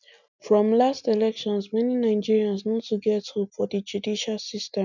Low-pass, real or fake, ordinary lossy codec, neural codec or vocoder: 7.2 kHz; real; none; none